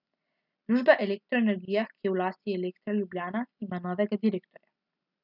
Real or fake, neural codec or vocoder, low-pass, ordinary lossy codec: real; none; 5.4 kHz; none